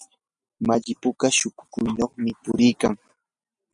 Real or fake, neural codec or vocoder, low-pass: real; none; 10.8 kHz